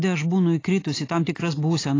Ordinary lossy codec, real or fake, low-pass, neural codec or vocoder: AAC, 32 kbps; real; 7.2 kHz; none